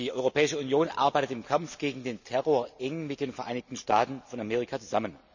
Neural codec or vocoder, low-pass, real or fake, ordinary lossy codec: none; 7.2 kHz; real; none